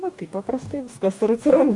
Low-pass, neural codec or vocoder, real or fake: 10.8 kHz; codec, 44.1 kHz, 2.6 kbps, DAC; fake